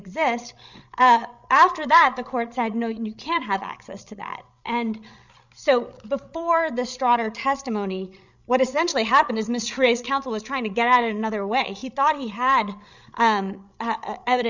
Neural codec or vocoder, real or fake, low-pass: codec, 16 kHz, 16 kbps, FreqCodec, larger model; fake; 7.2 kHz